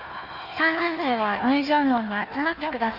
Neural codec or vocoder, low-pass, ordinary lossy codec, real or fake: codec, 16 kHz, 1 kbps, FunCodec, trained on Chinese and English, 50 frames a second; 5.4 kHz; Opus, 24 kbps; fake